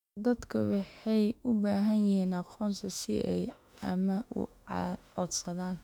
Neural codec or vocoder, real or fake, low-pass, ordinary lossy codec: autoencoder, 48 kHz, 32 numbers a frame, DAC-VAE, trained on Japanese speech; fake; 19.8 kHz; none